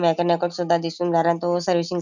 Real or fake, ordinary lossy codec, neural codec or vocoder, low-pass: real; none; none; 7.2 kHz